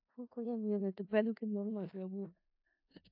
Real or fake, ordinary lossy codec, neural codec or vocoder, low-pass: fake; AAC, 48 kbps; codec, 16 kHz in and 24 kHz out, 0.4 kbps, LongCat-Audio-Codec, four codebook decoder; 5.4 kHz